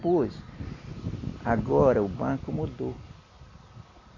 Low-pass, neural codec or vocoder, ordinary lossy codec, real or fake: 7.2 kHz; none; AAC, 32 kbps; real